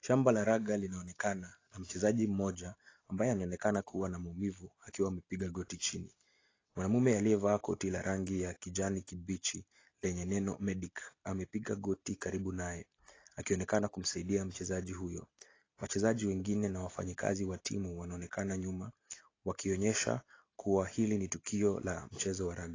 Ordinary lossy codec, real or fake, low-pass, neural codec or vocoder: AAC, 32 kbps; real; 7.2 kHz; none